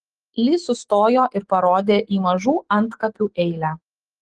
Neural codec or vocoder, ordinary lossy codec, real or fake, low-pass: autoencoder, 48 kHz, 128 numbers a frame, DAC-VAE, trained on Japanese speech; Opus, 16 kbps; fake; 10.8 kHz